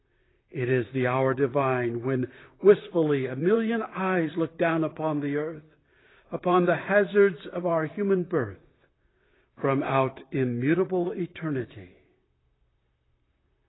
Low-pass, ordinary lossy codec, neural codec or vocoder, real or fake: 7.2 kHz; AAC, 16 kbps; vocoder, 44.1 kHz, 128 mel bands, Pupu-Vocoder; fake